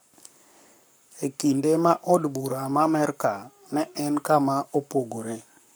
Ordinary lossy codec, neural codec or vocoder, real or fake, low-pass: none; codec, 44.1 kHz, 7.8 kbps, Pupu-Codec; fake; none